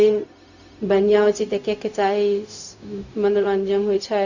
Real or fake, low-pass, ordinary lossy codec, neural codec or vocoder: fake; 7.2 kHz; none; codec, 16 kHz, 0.4 kbps, LongCat-Audio-Codec